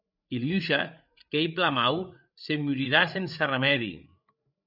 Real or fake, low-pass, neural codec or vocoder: fake; 5.4 kHz; codec, 16 kHz, 8 kbps, FreqCodec, larger model